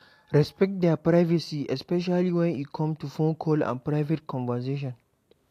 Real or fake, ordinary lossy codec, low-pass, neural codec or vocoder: real; AAC, 64 kbps; 14.4 kHz; none